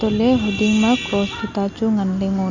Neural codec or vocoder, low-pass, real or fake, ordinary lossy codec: none; 7.2 kHz; real; none